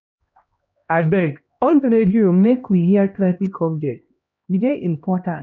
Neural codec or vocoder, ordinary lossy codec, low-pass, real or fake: codec, 16 kHz, 1 kbps, X-Codec, HuBERT features, trained on LibriSpeech; none; 7.2 kHz; fake